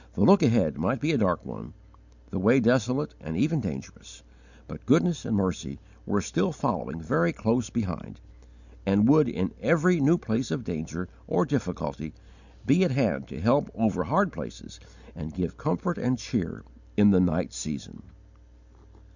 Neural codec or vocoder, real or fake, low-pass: none; real; 7.2 kHz